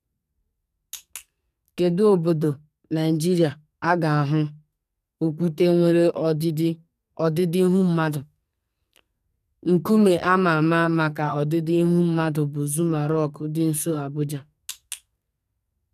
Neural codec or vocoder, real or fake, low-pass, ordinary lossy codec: codec, 44.1 kHz, 2.6 kbps, SNAC; fake; 14.4 kHz; none